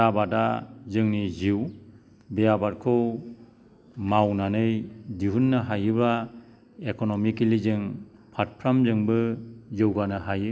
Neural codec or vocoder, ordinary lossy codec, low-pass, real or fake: none; none; none; real